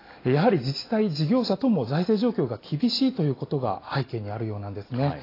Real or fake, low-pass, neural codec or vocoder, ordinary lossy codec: real; 5.4 kHz; none; AAC, 24 kbps